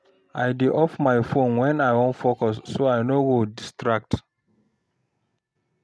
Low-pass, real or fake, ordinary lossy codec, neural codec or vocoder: none; real; none; none